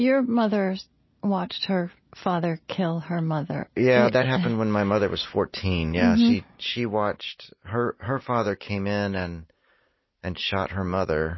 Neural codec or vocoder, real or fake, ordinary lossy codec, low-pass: none; real; MP3, 24 kbps; 7.2 kHz